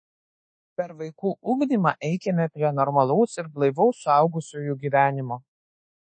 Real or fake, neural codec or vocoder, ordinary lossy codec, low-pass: fake; codec, 24 kHz, 1.2 kbps, DualCodec; MP3, 32 kbps; 9.9 kHz